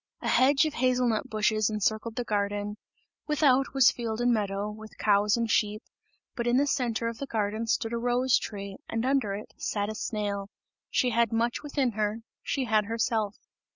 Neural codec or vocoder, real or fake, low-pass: none; real; 7.2 kHz